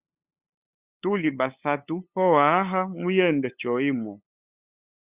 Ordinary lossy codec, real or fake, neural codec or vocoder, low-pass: Opus, 64 kbps; fake; codec, 16 kHz, 8 kbps, FunCodec, trained on LibriTTS, 25 frames a second; 3.6 kHz